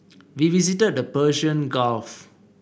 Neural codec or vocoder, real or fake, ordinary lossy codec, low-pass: none; real; none; none